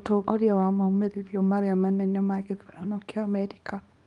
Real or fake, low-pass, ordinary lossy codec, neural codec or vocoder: fake; 10.8 kHz; Opus, 32 kbps; codec, 24 kHz, 0.9 kbps, WavTokenizer, small release